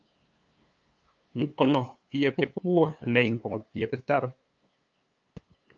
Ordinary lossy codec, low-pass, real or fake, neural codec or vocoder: Opus, 24 kbps; 7.2 kHz; fake; codec, 16 kHz, 2 kbps, FunCodec, trained on LibriTTS, 25 frames a second